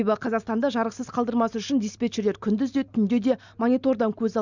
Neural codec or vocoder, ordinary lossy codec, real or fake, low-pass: none; none; real; 7.2 kHz